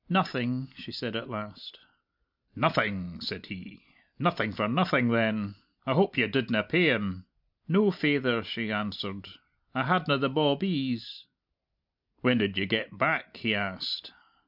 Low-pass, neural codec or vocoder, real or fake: 5.4 kHz; none; real